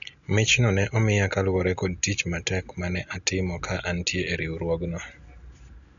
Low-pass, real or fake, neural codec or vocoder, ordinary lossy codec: 7.2 kHz; real; none; none